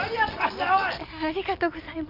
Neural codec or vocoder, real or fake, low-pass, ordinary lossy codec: none; real; 5.4 kHz; none